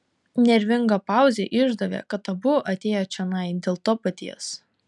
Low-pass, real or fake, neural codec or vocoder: 10.8 kHz; real; none